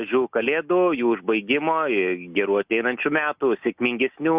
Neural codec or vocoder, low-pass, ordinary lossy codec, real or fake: none; 3.6 kHz; Opus, 64 kbps; real